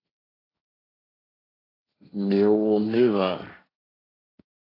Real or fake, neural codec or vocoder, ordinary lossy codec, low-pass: fake; codec, 16 kHz, 1.1 kbps, Voila-Tokenizer; AAC, 24 kbps; 5.4 kHz